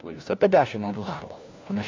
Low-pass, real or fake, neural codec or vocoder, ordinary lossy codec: 7.2 kHz; fake; codec, 16 kHz, 1 kbps, FunCodec, trained on LibriTTS, 50 frames a second; AAC, 32 kbps